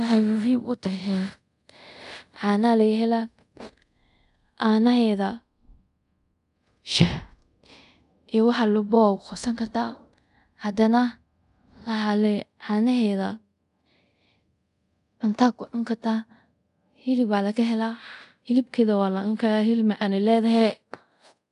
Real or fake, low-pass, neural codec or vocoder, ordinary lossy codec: fake; 10.8 kHz; codec, 24 kHz, 0.5 kbps, DualCodec; none